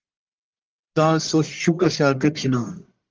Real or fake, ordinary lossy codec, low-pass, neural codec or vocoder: fake; Opus, 32 kbps; 7.2 kHz; codec, 44.1 kHz, 1.7 kbps, Pupu-Codec